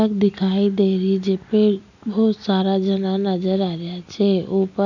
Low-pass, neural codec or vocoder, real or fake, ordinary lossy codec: 7.2 kHz; none; real; none